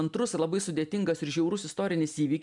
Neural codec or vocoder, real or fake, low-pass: none; real; 10.8 kHz